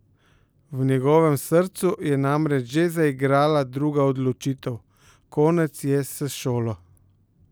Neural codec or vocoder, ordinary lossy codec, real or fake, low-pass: none; none; real; none